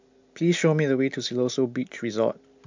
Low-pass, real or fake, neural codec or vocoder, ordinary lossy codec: 7.2 kHz; real; none; MP3, 64 kbps